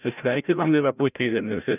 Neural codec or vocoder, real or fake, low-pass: codec, 16 kHz, 0.5 kbps, FreqCodec, larger model; fake; 3.6 kHz